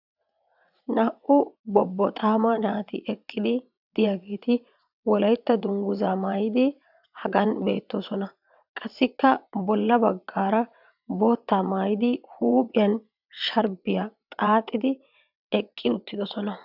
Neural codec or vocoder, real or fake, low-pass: vocoder, 22.05 kHz, 80 mel bands, WaveNeXt; fake; 5.4 kHz